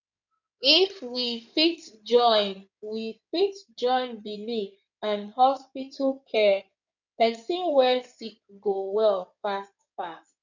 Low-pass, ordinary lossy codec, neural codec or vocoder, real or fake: 7.2 kHz; none; codec, 16 kHz in and 24 kHz out, 2.2 kbps, FireRedTTS-2 codec; fake